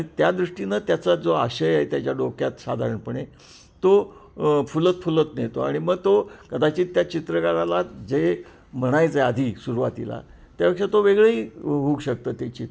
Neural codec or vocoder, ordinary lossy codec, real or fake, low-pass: none; none; real; none